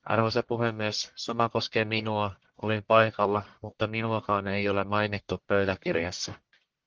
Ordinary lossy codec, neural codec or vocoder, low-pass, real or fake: Opus, 16 kbps; codec, 44.1 kHz, 1.7 kbps, Pupu-Codec; 7.2 kHz; fake